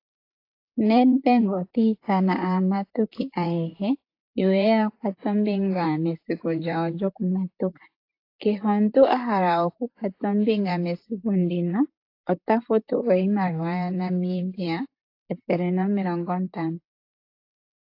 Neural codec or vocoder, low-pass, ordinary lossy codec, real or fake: vocoder, 44.1 kHz, 128 mel bands, Pupu-Vocoder; 5.4 kHz; AAC, 32 kbps; fake